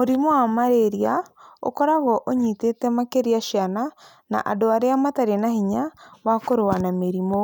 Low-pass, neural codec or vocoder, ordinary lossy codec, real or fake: none; none; none; real